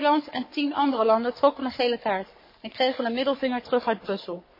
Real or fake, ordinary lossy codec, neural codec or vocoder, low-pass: fake; MP3, 24 kbps; codec, 44.1 kHz, 3.4 kbps, Pupu-Codec; 5.4 kHz